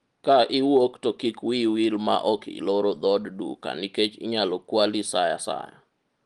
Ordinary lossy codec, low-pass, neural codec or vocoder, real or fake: Opus, 24 kbps; 10.8 kHz; none; real